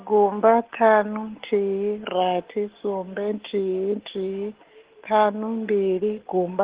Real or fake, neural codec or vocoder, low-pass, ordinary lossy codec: real; none; 3.6 kHz; Opus, 16 kbps